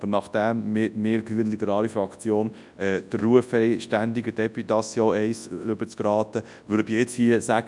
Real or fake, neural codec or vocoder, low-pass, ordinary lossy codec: fake; codec, 24 kHz, 0.9 kbps, WavTokenizer, large speech release; 10.8 kHz; none